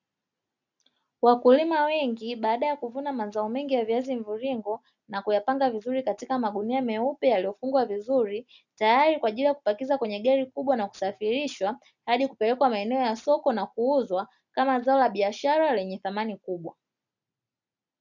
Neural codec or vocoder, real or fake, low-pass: none; real; 7.2 kHz